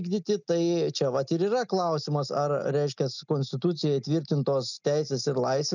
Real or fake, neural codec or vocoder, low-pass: real; none; 7.2 kHz